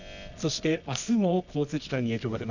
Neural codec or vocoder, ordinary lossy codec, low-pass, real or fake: codec, 24 kHz, 0.9 kbps, WavTokenizer, medium music audio release; none; 7.2 kHz; fake